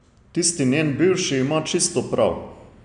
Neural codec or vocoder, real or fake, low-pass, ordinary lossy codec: none; real; 9.9 kHz; none